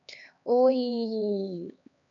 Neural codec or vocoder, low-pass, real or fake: codec, 16 kHz, 2 kbps, X-Codec, HuBERT features, trained on LibriSpeech; 7.2 kHz; fake